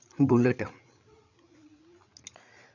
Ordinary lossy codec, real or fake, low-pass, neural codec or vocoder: none; fake; 7.2 kHz; codec, 16 kHz, 8 kbps, FreqCodec, larger model